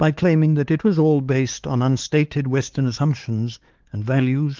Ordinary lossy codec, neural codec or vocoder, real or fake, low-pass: Opus, 16 kbps; codec, 16 kHz, 4 kbps, X-Codec, HuBERT features, trained on LibriSpeech; fake; 7.2 kHz